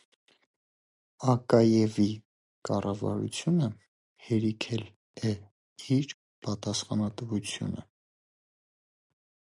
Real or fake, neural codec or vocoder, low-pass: real; none; 10.8 kHz